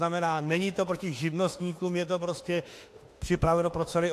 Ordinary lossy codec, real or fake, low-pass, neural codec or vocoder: AAC, 64 kbps; fake; 14.4 kHz; autoencoder, 48 kHz, 32 numbers a frame, DAC-VAE, trained on Japanese speech